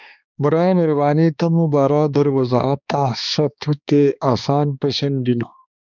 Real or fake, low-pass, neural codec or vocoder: fake; 7.2 kHz; codec, 16 kHz, 2 kbps, X-Codec, HuBERT features, trained on balanced general audio